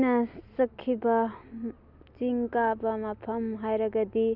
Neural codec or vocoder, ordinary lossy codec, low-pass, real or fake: none; none; 5.4 kHz; real